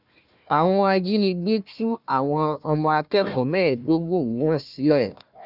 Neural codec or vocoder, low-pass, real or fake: codec, 16 kHz, 1 kbps, FunCodec, trained on Chinese and English, 50 frames a second; 5.4 kHz; fake